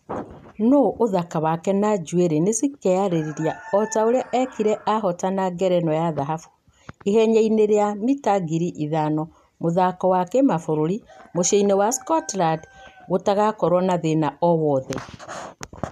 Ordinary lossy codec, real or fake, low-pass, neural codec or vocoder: none; real; 14.4 kHz; none